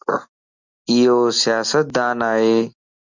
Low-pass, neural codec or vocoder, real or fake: 7.2 kHz; none; real